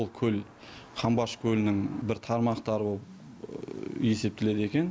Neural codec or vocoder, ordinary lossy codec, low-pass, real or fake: none; none; none; real